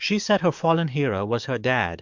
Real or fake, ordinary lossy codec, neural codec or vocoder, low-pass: fake; MP3, 64 kbps; codec, 44.1 kHz, 7.8 kbps, DAC; 7.2 kHz